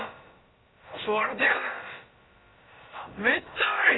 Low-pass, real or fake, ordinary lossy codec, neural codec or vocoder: 7.2 kHz; fake; AAC, 16 kbps; codec, 16 kHz, about 1 kbps, DyCAST, with the encoder's durations